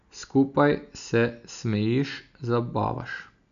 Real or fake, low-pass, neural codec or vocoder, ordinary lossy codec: real; 7.2 kHz; none; none